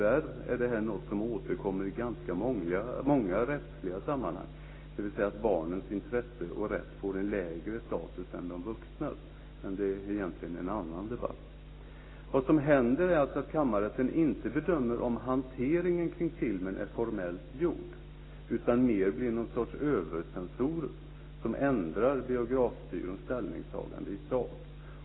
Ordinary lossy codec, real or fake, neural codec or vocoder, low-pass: AAC, 16 kbps; real; none; 7.2 kHz